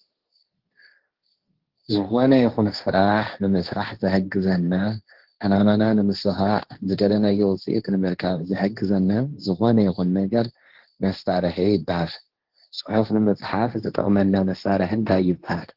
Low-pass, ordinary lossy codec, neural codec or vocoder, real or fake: 5.4 kHz; Opus, 16 kbps; codec, 16 kHz, 1.1 kbps, Voila-Tokenizer; fake